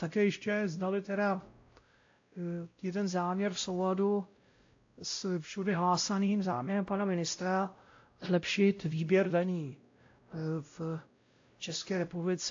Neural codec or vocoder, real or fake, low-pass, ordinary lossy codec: codec, 16 kHz, 0.5 kbps, X-Codec, WavLM features, trained on Multilingual LibriSpeech; fake; 7.2 kHz; AAC, 48 kbps